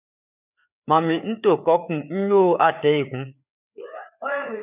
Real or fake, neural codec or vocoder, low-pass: fake; codec, 16 kHz, 4 kbps, FreqCodec, larger model; 3.6 kHz